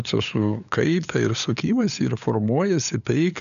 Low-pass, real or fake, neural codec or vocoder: 7.2 kHz; fake; codec, 16 kHz, 8 kbps, FunCodec, trained on LibriTTS, 25 frames a second